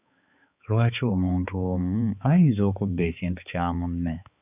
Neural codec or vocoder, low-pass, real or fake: codec, 16 kHz, 4 kbps, X-Codec, HuBERT features, trained on balanced general audio; 3.6 kHz; fake